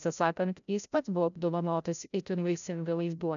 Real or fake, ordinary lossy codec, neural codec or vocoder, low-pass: fake; MP3, 96 kbps; codec, 16 kHz, 0.5 kbps, FreqCodec, larger model; 7.2 kHz